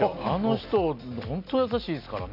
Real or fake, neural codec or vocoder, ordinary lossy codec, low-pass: real; none; none; 5.4 kHz